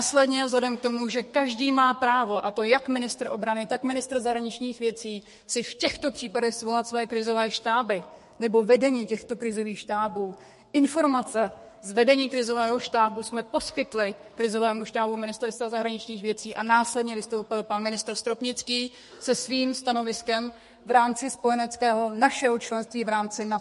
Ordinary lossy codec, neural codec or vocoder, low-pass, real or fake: MP3, 48 kbps; codec, 32 kHz, 1.9 kbps, SNAC; 14.4 kHz; fake